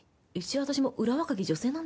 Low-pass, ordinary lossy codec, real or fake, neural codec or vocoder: none; none; real; none